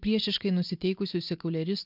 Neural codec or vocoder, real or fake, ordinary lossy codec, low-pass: none; real; MP3, 48 kbps; 5.4 kHz